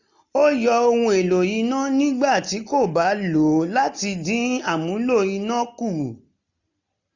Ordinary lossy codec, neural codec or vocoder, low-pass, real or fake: none; none; 7.2 kHz; real